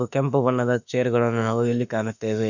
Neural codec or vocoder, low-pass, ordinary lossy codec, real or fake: autoencoder, 48 kHz, 32 numbers a frame, DAC-VAE, trained on Japanese speech; 7.2 kHz; none; fake